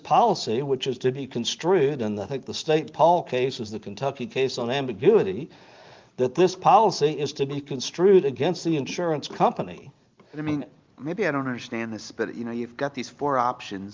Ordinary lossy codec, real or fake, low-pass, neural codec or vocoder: Opus, 32 kbps; real; 7.2 kHz; none